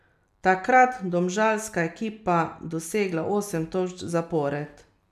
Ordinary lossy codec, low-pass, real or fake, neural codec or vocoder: none; 14.4 kHz; real; none